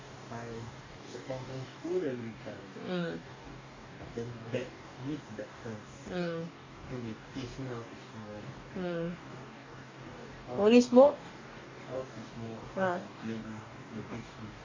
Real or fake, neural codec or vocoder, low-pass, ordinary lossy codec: fake; codec, 44.1 kHz, 2.6 kbps, DAC; 7.2 kHz; MP3, 48 kbps